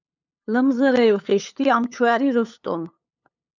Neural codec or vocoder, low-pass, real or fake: codec, 16 kHz, 8 kbps, FunCodec, trained on LibriTTS, 25 frames a second; 7.2 kHz; fake